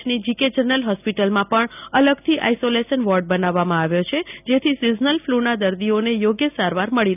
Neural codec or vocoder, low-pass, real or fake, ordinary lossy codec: none; 3.6 kHz; real; none